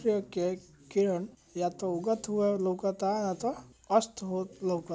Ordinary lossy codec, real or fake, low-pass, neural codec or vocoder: none; real; none; none